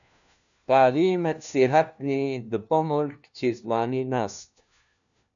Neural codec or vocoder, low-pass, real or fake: codec, 16 kHz, 1 kbps, FunCodec, trained on LibriTTS, 50 frames a second; 7.2 kHz; fake